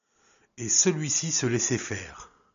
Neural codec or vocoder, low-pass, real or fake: none; 7.2 kHz; real